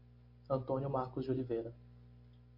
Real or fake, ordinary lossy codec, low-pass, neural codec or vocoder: real; MP3, 32 kbps; 5.4 kHz; none